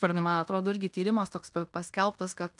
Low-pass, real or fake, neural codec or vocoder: 10.8 kHz; fake; codec, 16 kHz in and 24 kHz out, 0.9 kbps, LongCat-Audio-Codec, fine tuned four codebook decoder